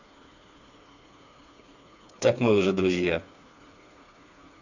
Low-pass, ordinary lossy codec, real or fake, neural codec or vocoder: 7.2 kHz; none; fake; codec, 16 kHz, 4 kbps, FreqCodec, smaller model